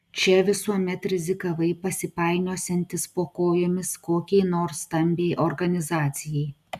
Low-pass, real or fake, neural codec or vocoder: 14.4 kHz; real; none